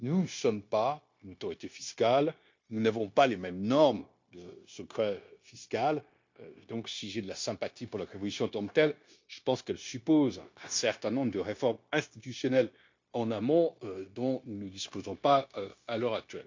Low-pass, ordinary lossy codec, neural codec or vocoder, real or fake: 7.2 kHz; MP3, 48 kbps; codec, 16 kHz, 0.9 kbps, LongCat-Audio-Codec; fake